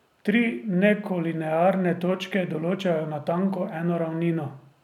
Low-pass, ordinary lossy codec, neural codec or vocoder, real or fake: 19.8 kHz; none; none; real